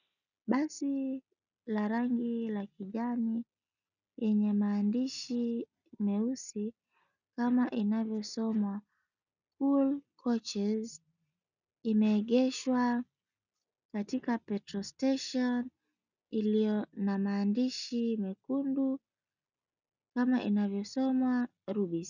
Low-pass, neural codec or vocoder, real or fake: 7.2 kHz; none; real